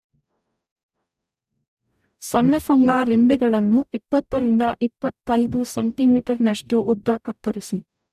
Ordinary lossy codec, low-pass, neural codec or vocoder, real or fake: none; 14.4 kHz; codec, 44.1 kHz, 0.9 kbps, DAC; fake